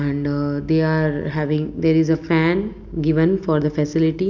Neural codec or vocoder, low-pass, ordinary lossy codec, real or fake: none; 7.2 kHz; none; real